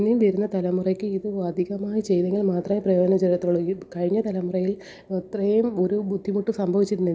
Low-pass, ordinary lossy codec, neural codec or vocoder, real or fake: none; none; none; real